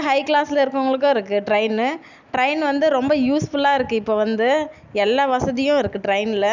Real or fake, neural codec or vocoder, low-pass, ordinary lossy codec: real; none; 7.2 kHz; none